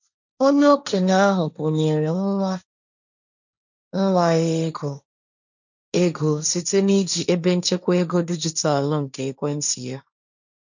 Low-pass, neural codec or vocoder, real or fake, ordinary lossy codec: 7.2 kHz; codec, 16 kHz, 1.1 kbps, Voila-Tokenizer; fake; none